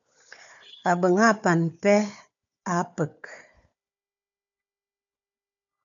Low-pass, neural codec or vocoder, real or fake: 7.2 kHz; codec, 16 kHz, 4 kbps, FunCodec, trained on Chinese and English, 50 frames a second; fake